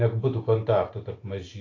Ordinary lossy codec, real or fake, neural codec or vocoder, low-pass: AAC, 32 kbps; real; none; 7.2 kHz